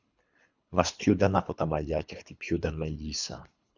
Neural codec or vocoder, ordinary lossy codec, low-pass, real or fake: codec, 24 kHz, 3 kbps, HILCodec; Opus, 64 kbps; 7.2 kHz; fake